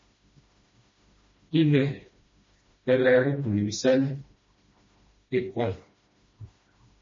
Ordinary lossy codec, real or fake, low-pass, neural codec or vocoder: MP3, 32 kbps; fake; 7.2 kHz; codec, 16 kHz, 1 kbps, FreqCodec, smaller model